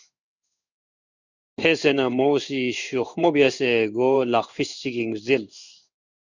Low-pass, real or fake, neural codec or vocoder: 7.2 kHz; fake; codec, 16 kHz in and 24 kHz out, 1 kbps, XY-Tokenizer